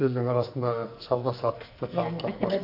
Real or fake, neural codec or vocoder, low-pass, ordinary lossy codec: fake; codec, 44.1 kHz, 2.6 kbps, SNAC; 5.4 kHz; none